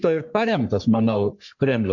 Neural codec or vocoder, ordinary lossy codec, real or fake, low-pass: codec, 44.1 kHz, 3.4 kbps, Pupu-Codec; MP3, 64 kbps; fake; 7.2 kHz